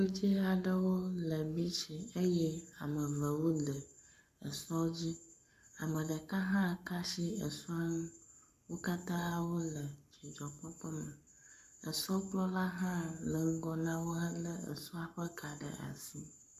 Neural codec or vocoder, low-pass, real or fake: codec, 44.1 kHz, 7.8 kbps, Pupu-Codec; 14.4 kHz; fake